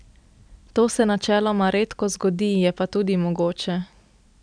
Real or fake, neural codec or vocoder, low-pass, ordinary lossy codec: real; none; 9.9 kHz; none